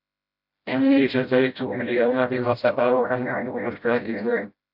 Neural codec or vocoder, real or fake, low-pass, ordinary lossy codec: codec, 16 kHz, 0.5 kbps, FreqCodec, smaller model; fake; 5.4 kHz; AAC, 48 kbps